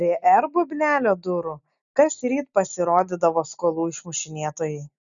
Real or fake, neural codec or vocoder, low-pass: real; none; 7.2 kHz